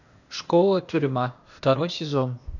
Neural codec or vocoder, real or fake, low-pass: codec, 16 kHz, 0.8 kbps, ZipCodec; fake; 7.2 kHz